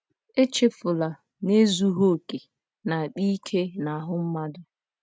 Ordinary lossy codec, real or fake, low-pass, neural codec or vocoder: none; real; none; none